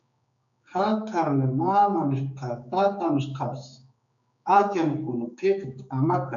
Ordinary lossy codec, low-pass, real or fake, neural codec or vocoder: MP3, 64 kbps; 7.2 kHz; fake; codec, 16 kHz, 4 kbps, X-Codec, HuBERT features, trained on general audio